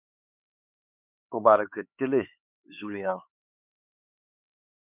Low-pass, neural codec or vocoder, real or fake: 3.6 kHz; codec, 16 kHz, 4 kbps, X-Codec, HuBERT features, trained on LibriSpeech; fake